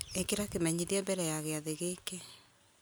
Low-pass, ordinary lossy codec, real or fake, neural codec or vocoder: none; none; real; none